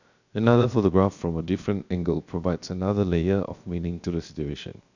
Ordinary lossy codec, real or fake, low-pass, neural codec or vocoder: none; fake; 7.2 kHz; codec, 16 kHz, 0.7 kbps, FocalCodec